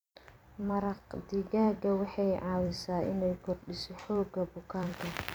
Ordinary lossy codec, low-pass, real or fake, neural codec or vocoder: none; none; fake; vocoder, 44.1 kHz, 128 mel bands every 256 samples, BigVGAN v2